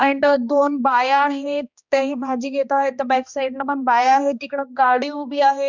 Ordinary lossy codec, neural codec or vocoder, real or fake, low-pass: none; codec, 16 kHz, 1 kbps, X-Codec, HuBERT features, trained on balanced general audio; fake; 7.2 kHz